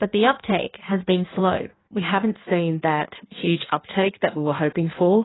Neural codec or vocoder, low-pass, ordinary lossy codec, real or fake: codec, 16 kHz in and 24 kHz out, 1.1 kbps, FireRedTTS-2 codec; 7.2 kHz; AAC, 16 kbps; fake